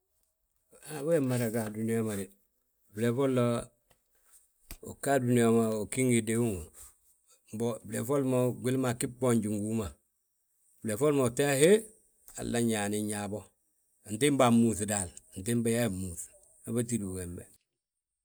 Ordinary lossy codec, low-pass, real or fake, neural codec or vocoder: none; none; real; none